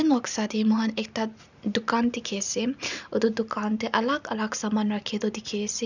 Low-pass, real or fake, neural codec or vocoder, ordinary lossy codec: 7.2 kHz; real; none; none